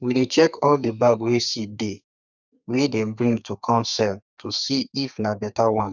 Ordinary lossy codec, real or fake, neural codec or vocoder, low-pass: none; fake; codec, 32 kHz, 1.9 kbps, SNAC; 7.2 kHz